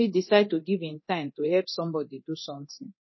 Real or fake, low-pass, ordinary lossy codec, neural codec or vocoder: fake; 7.2 kHz; MP3, 24 kbps; codec, 24 kHz, 0.9 kbps, DualCodec